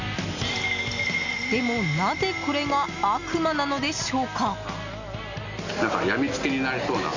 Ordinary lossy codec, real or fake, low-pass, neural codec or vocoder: none; real; 7.2 kHz; none